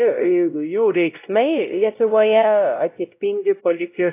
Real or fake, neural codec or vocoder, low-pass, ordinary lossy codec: fake; codec, 16 kHz, 0.5 kbps, X-Codec, WavLM features, trained on Multilingual LibriSpeech; 3.6 kHz; AAC, 24 kbps